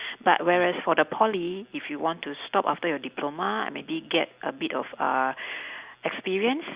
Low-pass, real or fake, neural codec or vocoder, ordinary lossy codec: 3.6 kHz; real; none; Opus, 64 kbps